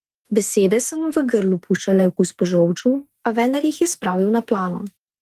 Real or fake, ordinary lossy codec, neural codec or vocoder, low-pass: fake; Opus, 24 kbps; autoencoder, 48 kHz, 32 numbers a frame, DAC-VAE, trained on Japanese speech; 14.4 kHz